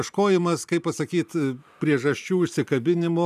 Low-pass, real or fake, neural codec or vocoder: 14.4 kHz; fake; vocoder, 44.1 kHz, 128 mel bands every 512 samples, BigVGAN v2